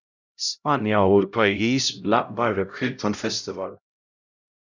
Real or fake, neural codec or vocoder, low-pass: fake; codec, 16 kHz, 0.5 kbps, X-Codec, HuBERT features, trained on LibriSpeech; 7.2 kHz